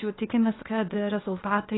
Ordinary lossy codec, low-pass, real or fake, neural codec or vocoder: AAC, 16 kbps; 7.2 kHz; fake; codec, 16 kHz, 0.8 kbps, ZipCodec